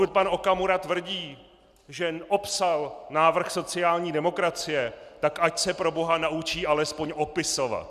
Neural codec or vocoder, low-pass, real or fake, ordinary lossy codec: none; 14.4 kHz; real; Opus, 64 kbps